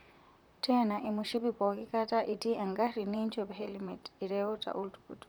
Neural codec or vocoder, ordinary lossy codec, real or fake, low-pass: vocoder, 44.1 kHz, 128 mel bands, Pupu-Vocoder; none; fake; none